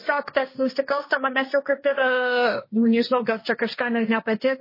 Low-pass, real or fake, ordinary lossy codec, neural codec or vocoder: 5.4 kHz; fake; MP3, 24 kbps; codec, 16 kHz, 1.1 kbps, Voila-Tokenizer